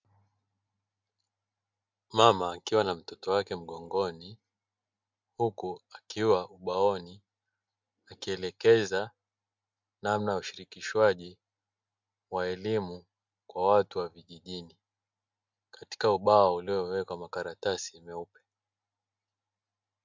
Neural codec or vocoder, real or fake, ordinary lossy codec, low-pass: none; real; MP3, 64 kbps; 7.2 kHz